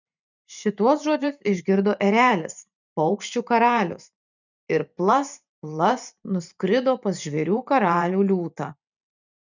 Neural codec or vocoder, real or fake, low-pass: vocoder, 44.1 kHz, 128 mel bands every 512 samples, BigVGAN v2; fake; 7.2 kHz